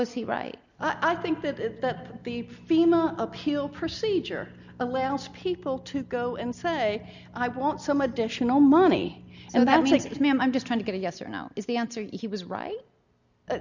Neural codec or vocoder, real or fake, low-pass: none; real; 7.2 kHz